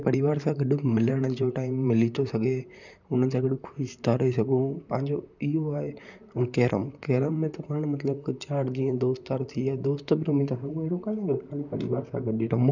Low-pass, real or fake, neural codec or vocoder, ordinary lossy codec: 7.2 kHz; fake; vocoder, 44.1 kHz, 128 mel bands, Pupu-Vocoder; none